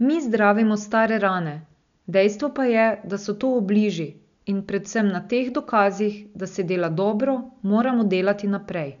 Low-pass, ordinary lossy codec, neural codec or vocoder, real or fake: 7.2 kHz; none; none; real